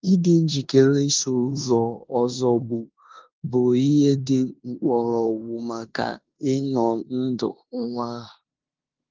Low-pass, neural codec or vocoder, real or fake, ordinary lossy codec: 7.2 kHz; codec, 16 kHz in and 24 kHz out, 0.9 kbps, LongCat-Audio-Codec, four codebook decoder; fake; Opus, 32 kbps